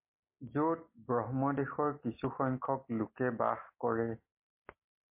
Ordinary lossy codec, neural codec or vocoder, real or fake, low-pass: MP3, 24 kbps; none; real; 3.6 kHz